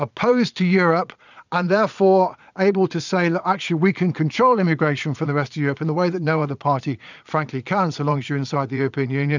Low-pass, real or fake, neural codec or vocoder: 7.2 kHz; fake; vocoder, 22.05 kHz, 80 mel bands, Vocos